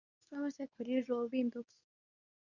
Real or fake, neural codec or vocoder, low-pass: fake; codec, 24 kHz, 0.9 kbps, WavTokenizer, medium speech release version 2; 7.2 kHz